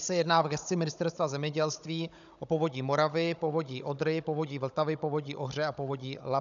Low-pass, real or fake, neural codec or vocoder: 7.2 kHz; fake; codec, 16 kHz, 16 kbps, FunCodec, trained on Chinese and English, 50 frames a second